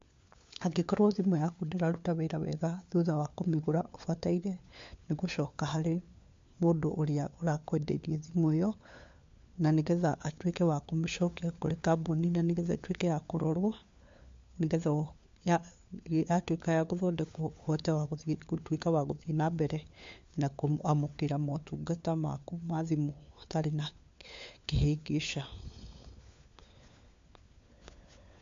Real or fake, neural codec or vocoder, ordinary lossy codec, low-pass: fake; codec, 16 kHz, 4 kbps, FunCodec, trained on LibriTTS, 50 frames a second; MP3, 48 kbps; 7.2 kHz